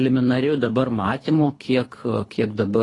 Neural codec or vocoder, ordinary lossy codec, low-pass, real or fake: codec, 24 kHz, 3 kbps, HILCodec; AAC, 32 kbps; 10.8 kHz; fake